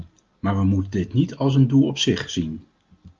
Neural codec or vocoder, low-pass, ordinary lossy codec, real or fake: none; 7.2 kHz; Opus, 24 kbps; real